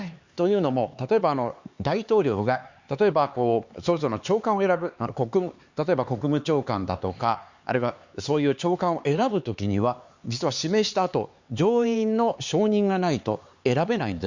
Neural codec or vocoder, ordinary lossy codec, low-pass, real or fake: codec, 16 kHz, 4 kbps, X-Codec, HuBERT features, trained on LibriSpeech; Opus, 64 kbps; 7.2 kHz; fake